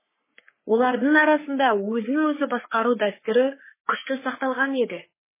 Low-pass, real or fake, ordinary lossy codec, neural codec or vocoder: 3.6 kHz; fake; MP3, 16 kbps; codec, 44.1 kHz, 3.4 kbps, Pupu-Codec